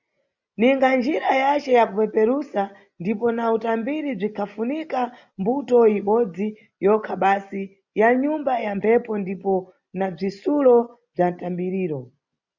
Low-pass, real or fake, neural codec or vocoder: 7.2 kHz; real; none